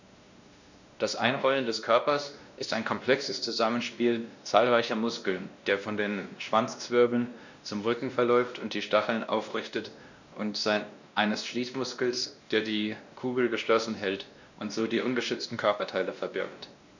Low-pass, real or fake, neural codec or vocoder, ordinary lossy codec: 7.2 kHz; fake; codec, 16 kHz, 1 kbps, X-Codec, WavLM features, trained on Multilingual LibriSpeech; none